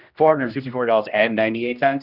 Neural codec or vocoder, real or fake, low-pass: codec, 16 kHz, 1 kbps, X-Codec, HuBERT features, trained on general audio; fake; 5.4 kHz